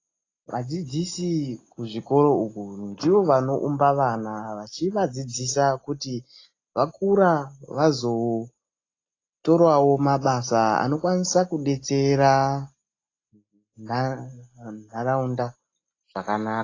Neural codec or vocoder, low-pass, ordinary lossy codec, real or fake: none; 7.2 kHz; AAC, 32 kbps; real